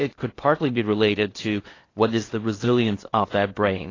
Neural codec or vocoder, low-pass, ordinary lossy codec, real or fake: codec, 16 kHz in and 24 kHz out, 0.8 kbps, FocalCodec, streaming, 65536 codes; 7.2 kHz; AAC, 32 kbps; fake